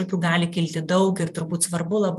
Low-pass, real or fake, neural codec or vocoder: 14.4 kHz; fake; vocoder, 44.1 kHz, 128 mel bands every 256 samples, BigVGAN v2